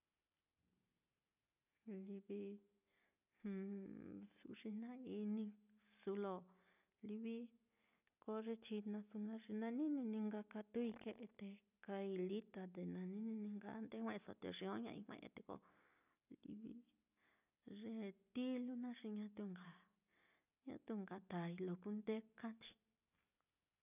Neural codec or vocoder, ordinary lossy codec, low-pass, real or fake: none; none; 3.6 kHz; real